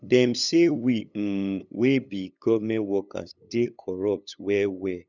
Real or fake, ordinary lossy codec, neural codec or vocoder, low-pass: fake; none; codec, 16 kHz, 8 kbps, FunCodec, trained on LibriTTS, 25 frames a second; 7.2 kHz